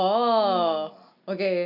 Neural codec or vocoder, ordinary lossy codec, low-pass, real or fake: none; none; 5.4 kHz; real